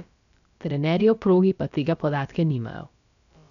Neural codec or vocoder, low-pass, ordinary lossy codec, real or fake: codec, 16 kHz, about 1 kbps, DyCAST, with the encoder's durations; 7.2 kHz; none; fake